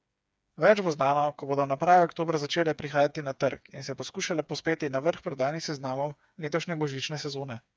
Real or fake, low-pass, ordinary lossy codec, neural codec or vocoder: fake; none; none; codec, 16 kHz, 4 kbps, FreqCodec, smaller model